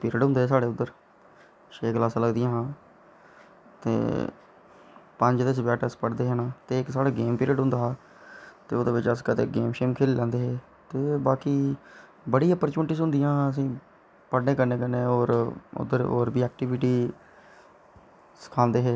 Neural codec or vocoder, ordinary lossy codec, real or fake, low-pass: none; none; real; none